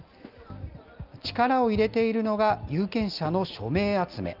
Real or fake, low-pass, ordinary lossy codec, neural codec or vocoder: real; 5.4 kHz; Opus, 24 kbps; none